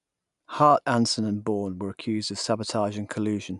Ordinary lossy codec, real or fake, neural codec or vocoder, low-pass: none; real; none; 10.8 kHz